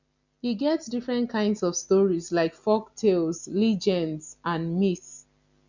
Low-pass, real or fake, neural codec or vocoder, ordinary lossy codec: 7.2 kHz; real; none; none